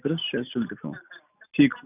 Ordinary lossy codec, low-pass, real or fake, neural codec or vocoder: none; 3.6 kHz; real; none